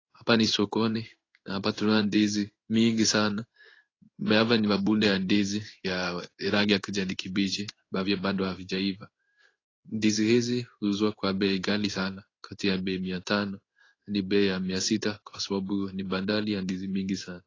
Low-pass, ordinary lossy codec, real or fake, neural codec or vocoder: 7.2 kHz; AAC, 32 kbps; fake; codec, 16 kHz in and 24 kHz out, 1 kbps, XY-Tokenizer